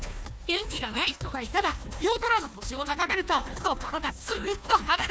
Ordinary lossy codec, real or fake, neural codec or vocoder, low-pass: none; fake; codec, 16 kHz, 1 kbps, FunCodec, trained on Chinese and English, 50 frames a second; none